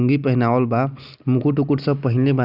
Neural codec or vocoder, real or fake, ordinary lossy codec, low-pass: none; real; none; 5.4 kHz